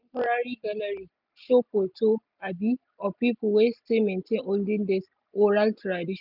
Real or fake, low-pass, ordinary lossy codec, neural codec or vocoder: real; 5.4 kHz; none; none